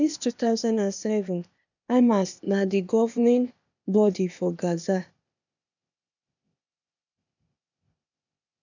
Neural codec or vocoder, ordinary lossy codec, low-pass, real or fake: codec, 16 kHz, 0.8 kbps, ZipCodec; none; 7.2 kHz; fake